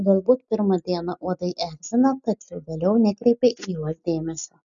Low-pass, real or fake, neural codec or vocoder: 7.2 kHz; real; none